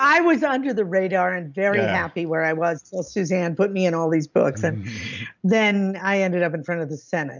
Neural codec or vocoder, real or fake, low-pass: none; real; 7.2 kHz